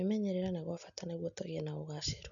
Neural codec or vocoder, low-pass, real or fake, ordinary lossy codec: none; 7.2 kHz; real; none